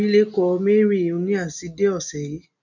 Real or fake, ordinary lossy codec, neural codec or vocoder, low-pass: real; none; none; 7.2 kHz